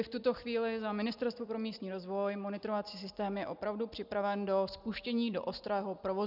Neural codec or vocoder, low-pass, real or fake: none; 5.4 kHz; real